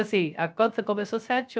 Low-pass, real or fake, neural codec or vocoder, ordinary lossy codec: none; fake; codec, 16 kHz, 0.3 kbps, FocalCodec; none